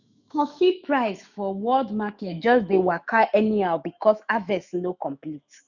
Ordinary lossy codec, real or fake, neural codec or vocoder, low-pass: none; fake; autoencoder, 48 kHz, 128 numbers a frame, DAC-VAE, trained on Japanese speech; 7.2 kHz